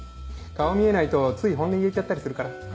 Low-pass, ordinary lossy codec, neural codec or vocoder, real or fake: none; none; none; real